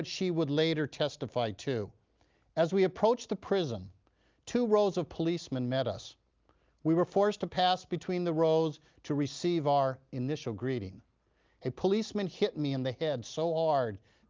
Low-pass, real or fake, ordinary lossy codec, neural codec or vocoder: 7.2 kHz; real; Opus, 32 kbps; none